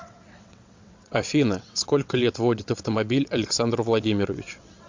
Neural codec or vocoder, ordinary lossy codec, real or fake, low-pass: vocoder, 22.05 kHz, 80 mel bands, WaveNeXt; MP3, 64 kbps; fake; 7.2 kHz